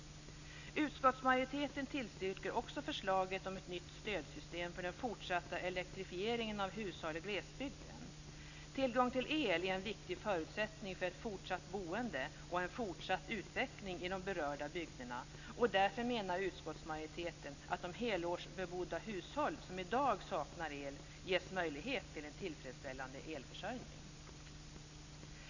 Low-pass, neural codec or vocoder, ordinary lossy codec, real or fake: 7.2 kHz; none; none; real